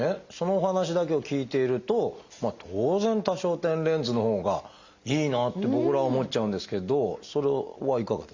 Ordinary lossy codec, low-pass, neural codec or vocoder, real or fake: Opus, 64 kbps; 7.2 kHz; none; real